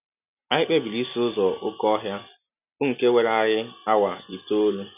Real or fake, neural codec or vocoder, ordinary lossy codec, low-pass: real; none; none; 3.6 kHz